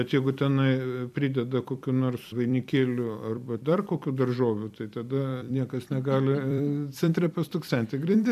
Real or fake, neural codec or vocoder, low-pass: fake; vocoder, 44.1 kHz, 128 mel bands every 256 samples, BigVGAN v2; 14.4 kHz